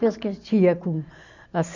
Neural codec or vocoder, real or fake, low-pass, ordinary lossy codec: none; real; 7.2 kHz; none